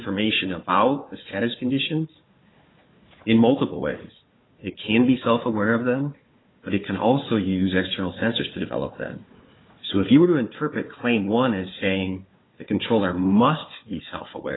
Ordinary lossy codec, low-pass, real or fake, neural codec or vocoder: AAC, 16 kbps; 7.2 kHz; fake; codec, 16 kHz, 4 kbps, FunCodec, trained on Chinese and English, 50 frames a second